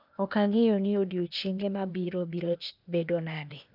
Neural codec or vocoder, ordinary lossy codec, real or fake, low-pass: codec, 16 kHz, 0.8 kbps, ZipCodec; none; fake; 5.4 kHz